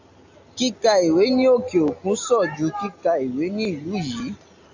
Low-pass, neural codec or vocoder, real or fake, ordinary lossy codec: 7.2 kHz; none; real; AAC, 48 kbps